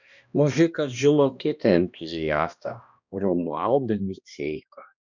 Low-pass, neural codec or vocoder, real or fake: 7.2 kHz; codec, 16 kHz, 1 kbps, X-Codec, HuBERT features, trained on balanced general audio; fake